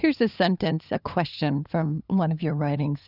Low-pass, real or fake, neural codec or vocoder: 5.4 kHz; fake; codec, 16 kHz, 2 kbps, FunCodec, trained on LibriTTS, 25 frames a second